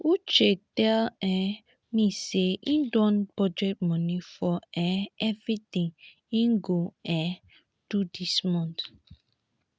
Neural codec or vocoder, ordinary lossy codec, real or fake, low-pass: none; none; real; none